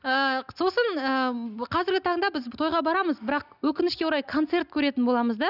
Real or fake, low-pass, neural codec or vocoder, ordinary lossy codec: real; 5.4 kHz; none; none